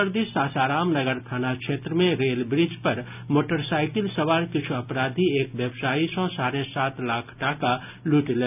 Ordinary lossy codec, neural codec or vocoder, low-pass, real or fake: none; none; 3.6 kHz; real